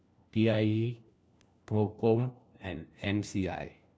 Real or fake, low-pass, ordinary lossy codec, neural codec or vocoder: fake; none; none; codec, 16 kHz, 1 kbps, FunCodec, trained on LibriTTS, 50 frames a second